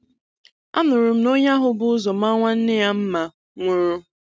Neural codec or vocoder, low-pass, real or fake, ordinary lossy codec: none; none; real; none